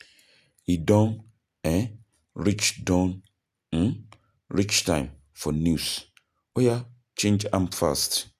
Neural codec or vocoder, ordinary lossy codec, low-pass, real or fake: none; none; 14.4 kHz; real